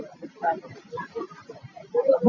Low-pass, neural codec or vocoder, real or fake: 7.2 kHz; none; real